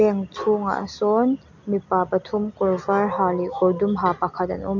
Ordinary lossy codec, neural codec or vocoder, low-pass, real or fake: none; none; 7.2 kHz; real